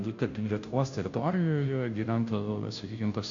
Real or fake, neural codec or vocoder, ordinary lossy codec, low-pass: fake; codec, 16 kHz, 0.5 kbps, FunCodec, trained on Chinese and English, 25 frames a second; MP3, 48 kbps; 7.2 kHz